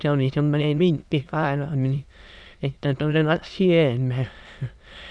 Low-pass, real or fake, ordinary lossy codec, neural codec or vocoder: none; fake; none; autoencoder, 22.05 kHz, a latent of 192 numbers a frame, VITS, trained on many speakers